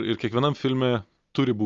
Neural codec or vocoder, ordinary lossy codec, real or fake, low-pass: none; Opus, 32 kbps; real; 7.2 kHz